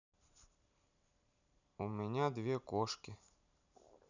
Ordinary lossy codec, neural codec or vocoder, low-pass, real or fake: none; vocoder, 44.1 kHz, 128 mel bands every 512 samples, BigVGAN v2; 7.2 kHz; fake